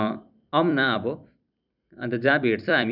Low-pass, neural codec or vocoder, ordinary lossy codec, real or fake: 5.4 kHz; vocoder, 44.1 kHz, 128 mel bands every 256 samples, BigVGAN v2; none; fake